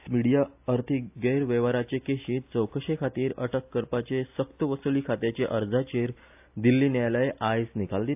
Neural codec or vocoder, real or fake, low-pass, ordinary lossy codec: none; real; 3.6 kHz; AAC, 32 kbps